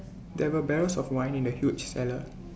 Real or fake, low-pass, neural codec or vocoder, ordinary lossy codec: real; none; none; none